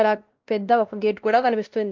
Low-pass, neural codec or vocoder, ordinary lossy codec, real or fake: 7.2 kHz; codec, 16 kHz, 1 kbps, X-Codec, WavLM features, trained on Multilingual LibriSpeech; Opus, 24 kbps; fake